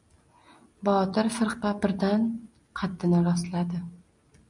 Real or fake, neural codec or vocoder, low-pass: real; none; 10.8 kHz